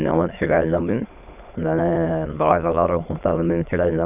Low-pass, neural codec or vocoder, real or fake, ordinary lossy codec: 3.6 kHz; autoencoder, 22.05 kHz, a latent of 192 numbers a frame, VITS, trained on many speakers; fake; none